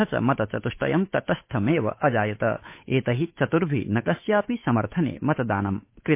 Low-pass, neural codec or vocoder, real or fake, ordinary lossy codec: 3.6 kHz; codec, 16 kHz, 8 kbps, FunCodec, trained on Chinese and English, 25 frames a second; fake; MP3, 24 kbps